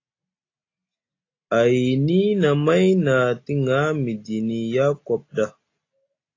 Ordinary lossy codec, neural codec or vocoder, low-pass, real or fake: AAC, 32 kbps; none; 7.2 kHz; real